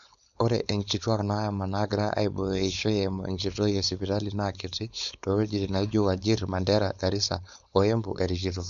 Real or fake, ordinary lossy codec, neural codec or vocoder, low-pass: fake; none; codec, 16 kHz, 4.8 kbps, FACodec; 7.2 kHz